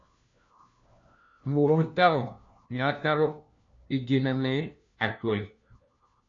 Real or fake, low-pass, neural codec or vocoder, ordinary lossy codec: fake; 7.2 kHz; codec, 16 kHz, 1 kbps, FunCodec, trained on LibriTTS, 50 frames a second; MP3, 64 kbps